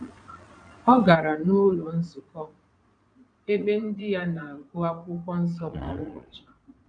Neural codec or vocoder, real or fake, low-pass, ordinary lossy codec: vocoder, 22.05 kHz, 80 mel bands, Vocos; fake; 9.9 kHz; none